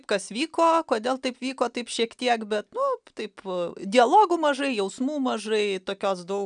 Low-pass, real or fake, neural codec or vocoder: 9.9 kHz; real; none